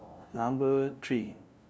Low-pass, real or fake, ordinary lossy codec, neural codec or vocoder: none; fake; none; codec, 16 kHz, 0.5 kbps, FunCodec, trained on LibriTTS, 25 frames a second